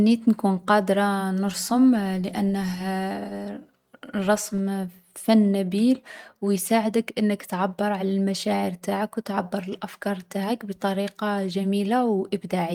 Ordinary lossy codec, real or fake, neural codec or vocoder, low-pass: Opus, 32 kbps; real; none; 19.8 kHz